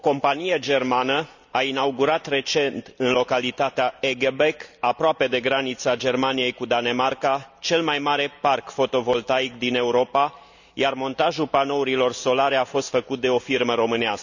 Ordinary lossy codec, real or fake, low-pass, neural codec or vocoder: none; real; 7.2 kHz; none